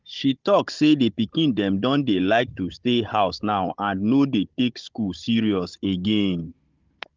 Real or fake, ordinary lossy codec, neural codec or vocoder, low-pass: fake; Opus, 32 kbps; codec, 16 kHz, 16 kbps, FunCodec, trained on Chinese and English, 50 frames a second; 7.2 kHz